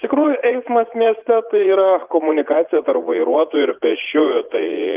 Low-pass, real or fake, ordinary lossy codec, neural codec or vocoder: 3.6 kHz; fake; Opus, 24 kbps; vocoder, 44.1 kHz, 80 mel bands, Vocos